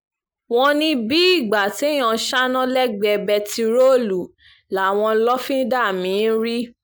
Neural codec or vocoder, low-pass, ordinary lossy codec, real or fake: none; none; none; real